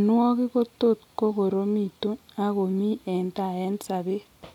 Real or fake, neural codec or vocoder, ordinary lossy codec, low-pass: real; none; none; 19.8 kHz